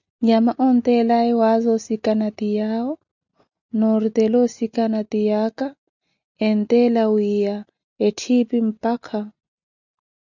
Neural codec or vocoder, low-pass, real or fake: none; 7.2 kHz; real